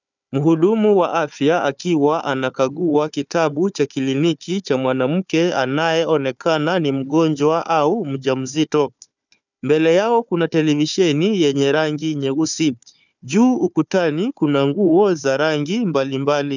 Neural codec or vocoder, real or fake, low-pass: codec, 16 kHz, 4 kbps, FunCodec, trained on Chinese and English, 50 frames a second; fake; 7.2 kHz